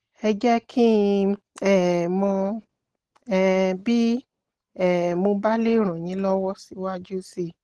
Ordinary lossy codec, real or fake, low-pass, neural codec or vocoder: Opus, 16 kbps; real; 10.8 kHz; none